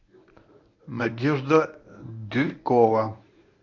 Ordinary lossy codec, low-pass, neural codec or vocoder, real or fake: MP3, 64 kbps; 7.2 kHz; codec, 24 kHz, 0.9 kbps, WavTokenizer, medium speech release version 1; fake